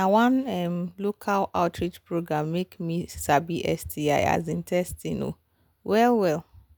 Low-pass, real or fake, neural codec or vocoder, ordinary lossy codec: none; real; none; none